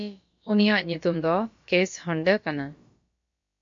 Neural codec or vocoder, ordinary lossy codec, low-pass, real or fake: codec, 16 kHz, about 1 kbps, DyCAST, with the encoder's durations; MP3, 48 kbps; 7.2 kHz; fake